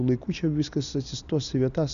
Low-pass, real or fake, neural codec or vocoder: 7.2 kHz; real; none